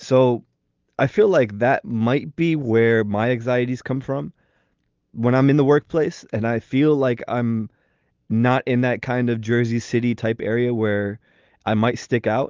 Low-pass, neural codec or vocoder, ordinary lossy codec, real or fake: 7.2 kHz; none; Opus, 32 kbps; real